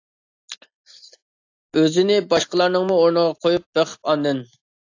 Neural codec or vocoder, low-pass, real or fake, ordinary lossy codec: none; 7.2 kHz; real; AAC, 48 kbps